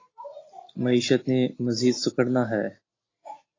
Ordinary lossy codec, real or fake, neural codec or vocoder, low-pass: AAC, 32 kbps; real; none; 7.2 kHz